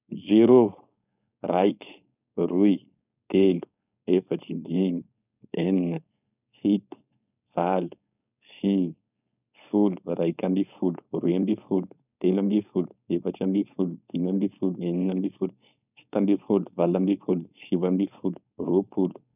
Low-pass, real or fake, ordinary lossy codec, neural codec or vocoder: 3.6 kHz; fake; none; codec, 16 kHz, 4.8 kbps, FACodec